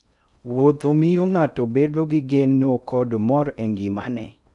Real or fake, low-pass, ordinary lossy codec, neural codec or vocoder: fake; 10.8 kHz; none; codec, 16 kHz in and 24 kHz out, 0.6 kbps, FocalCodec, streaming, 2048 codes